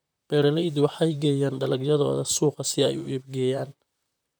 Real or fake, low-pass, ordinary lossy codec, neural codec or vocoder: fake; none; none; vocoder, 44.1 kHz, 128 mel bands, Pupu-Vocoder